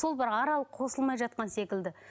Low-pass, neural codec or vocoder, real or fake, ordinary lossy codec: none; none; real; none